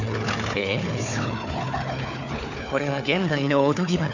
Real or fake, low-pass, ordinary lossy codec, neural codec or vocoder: fake; 7.2 kHz; none; codec, 16 kHz, 4 kbps, FunCodec, trained on Chinese and English, 50 frames a second